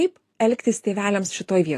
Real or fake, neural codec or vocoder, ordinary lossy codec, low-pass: real; none; AAC, 48 kbps; 14.4 kHz